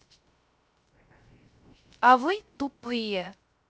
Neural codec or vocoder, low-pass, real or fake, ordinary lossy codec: codec, 16 kHz, 0.3 kbps, FocalCodec; none; fake; none